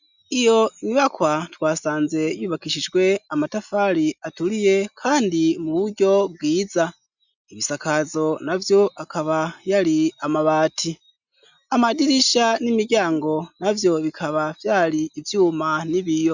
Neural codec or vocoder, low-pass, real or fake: none; 7.2 kHz; real